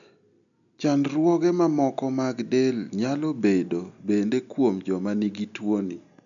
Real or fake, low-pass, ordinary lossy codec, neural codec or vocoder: real; 7.2 kHz; none; none